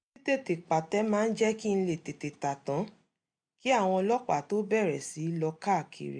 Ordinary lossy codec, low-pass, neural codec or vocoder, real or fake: none; 9.9 kHz; none; real